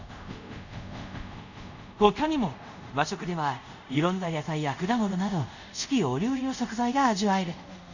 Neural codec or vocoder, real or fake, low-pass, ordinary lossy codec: codec, 24 kHz, 0.5 kbps, DualCodec; fake; 7.2 kHz; none